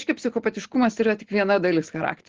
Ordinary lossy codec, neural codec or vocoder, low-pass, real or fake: Opus, 16 kbps; none; 7.2 kHz; real